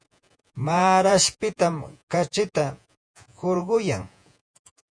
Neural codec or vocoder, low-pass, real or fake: vocoder, 48 kHz, 128 mel bands, Vocos; 9.9 kHz; fake